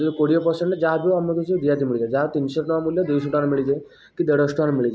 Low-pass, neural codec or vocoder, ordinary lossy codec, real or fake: none; none; none; real